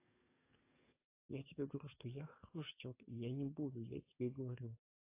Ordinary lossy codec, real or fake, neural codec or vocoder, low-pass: AAC, 24 kbps; fake; codec, 44.1 kHz, 7.8 kbps, DAC; 3.6 kHz